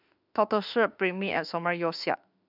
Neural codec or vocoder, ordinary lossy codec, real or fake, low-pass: autoencoder, 48 kHz, 32 numbers a frame, DAC-VAE, trained on Japanese speech; none; fake; 5.4 kHz